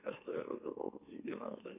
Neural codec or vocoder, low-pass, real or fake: autoencoder, 44.1 kHz, a latent of 192 numbers a frame, MeloTTS; 3.6 kHz; fake